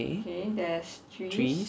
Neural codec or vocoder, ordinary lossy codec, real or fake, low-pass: none; none; real; none